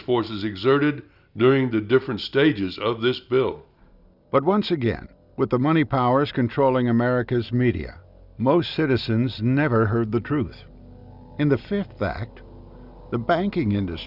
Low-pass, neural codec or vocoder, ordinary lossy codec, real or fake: 5.4 kHz; none; Opus, 64 kbps; real